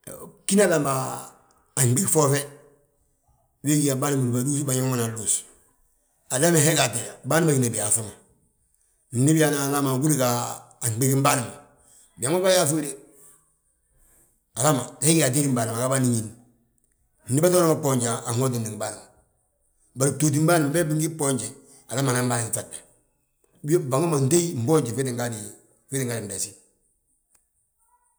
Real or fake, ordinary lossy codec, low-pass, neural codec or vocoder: real; none; none; none